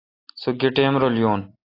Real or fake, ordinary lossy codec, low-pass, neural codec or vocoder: real; AAC, 24 kbps; 5.4 kHz; none